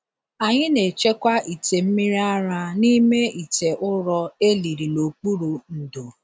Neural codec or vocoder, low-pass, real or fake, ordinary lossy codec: none; none; real; none